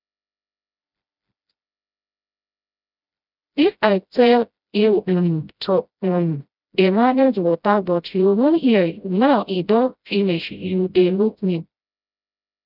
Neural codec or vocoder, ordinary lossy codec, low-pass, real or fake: codec, 16 kHz, 0.5 kbps, FreqCodec, smaller model; none; 5.4 kHz; fake